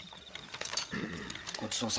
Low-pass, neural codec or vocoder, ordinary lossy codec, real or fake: none; codec, 16 kHz, 8 kbps, FreqCodec, larger model; none; fake